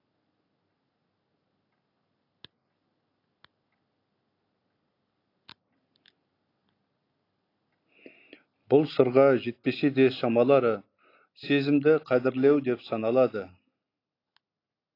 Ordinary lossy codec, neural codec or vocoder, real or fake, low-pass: AAC, 32 kbps; none; real; 5.4 kHz